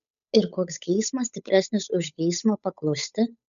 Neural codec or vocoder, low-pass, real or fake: codec, 16 kHz, 8 kbps, FunCodec, trained on Chinese and English, 25 frames a second; 7.2 kHz; fake